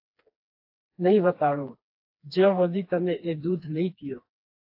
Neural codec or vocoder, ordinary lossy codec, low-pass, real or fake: codec, 16 kHz, 2 kbps, FreqCodec, smaller model; AAC, 32 kbps; 5.4 kHz; fake